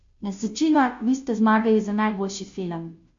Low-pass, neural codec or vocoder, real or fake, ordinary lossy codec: 7.2 kHz; codec, 16 kHz, 0.5 kbps, FunCodec, trained on Chinese and English, 25 frames a second; fake; MP3, 48 kbps